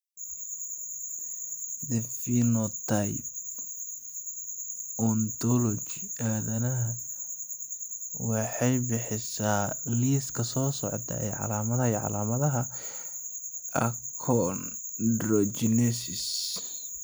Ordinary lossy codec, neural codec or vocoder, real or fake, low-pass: none; none; real; none